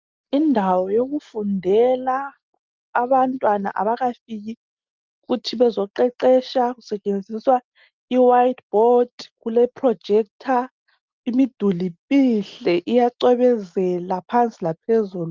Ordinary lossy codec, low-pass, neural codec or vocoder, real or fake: Opus, 32 kbps; 7.2 kHz; none; real